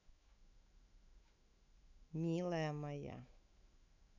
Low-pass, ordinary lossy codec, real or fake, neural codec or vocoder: 7.2 kHz; none; fake; autoencoder, 48 kHz, 128 numbers a frame, DAC-VAE, trained on Japanese speech